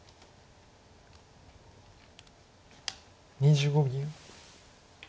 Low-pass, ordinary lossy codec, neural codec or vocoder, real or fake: none; none; none; real